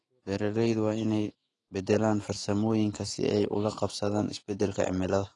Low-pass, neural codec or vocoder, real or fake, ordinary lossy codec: 10.8 kHz; autoencoder, 48 kHz, 128 numbers a frame, DAC-VAE, trained on Japanese speech; fake; AAC, 32 kbps